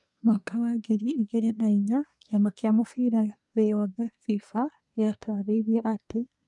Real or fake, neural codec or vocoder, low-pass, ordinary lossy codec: fake; codec, 24 kHz, 1 kbps, SNAC; 10.8 kHz; none